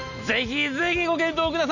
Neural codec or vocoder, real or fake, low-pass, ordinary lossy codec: none; real; 7.2 kHz; none